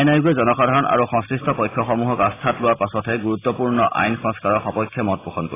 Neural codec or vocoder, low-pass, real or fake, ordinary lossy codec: none; 3.6 kHz; real; AAC, 16 kbps